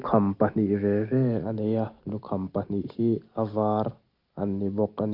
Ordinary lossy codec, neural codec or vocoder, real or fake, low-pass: Opus, 24 kbps; none; real; 5.4 kHz